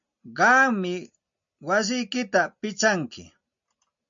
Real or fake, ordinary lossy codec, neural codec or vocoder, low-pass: real; MP3, 64 kbps; none; 7.2 kHz